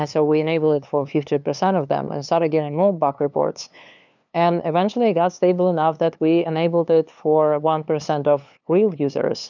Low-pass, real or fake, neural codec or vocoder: 7.2 kHz; fake; codec, 16 kHz, 2 kbps, FunCodec, trained on LibriTTS, 25 frames a second